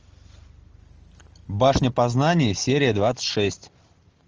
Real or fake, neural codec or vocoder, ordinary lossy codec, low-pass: real; none; Opus, 24 kbps; 7.2 kHz